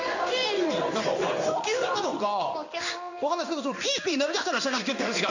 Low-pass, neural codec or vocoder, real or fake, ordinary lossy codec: 7.2 kHz; codec, 16 kHz in and 24 kHz out, 1 kbps, XY-Tokenizer; fake; none